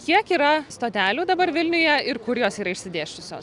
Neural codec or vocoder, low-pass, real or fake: none; 10.8 kHz; real